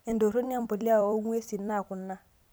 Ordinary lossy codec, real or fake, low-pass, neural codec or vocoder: none; fake; none; vocoder, 44.1 kHz, 128 mel bands every 256 samples, BigVGAN v2